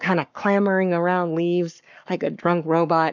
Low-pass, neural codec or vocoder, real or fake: 7.2 kHz; codec, 44.1 kHz, 7.8 kbps, Pupu-Codec; fake